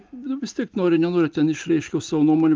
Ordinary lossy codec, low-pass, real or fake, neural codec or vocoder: Opus, 24 kbps; 7.2 kHz; real; none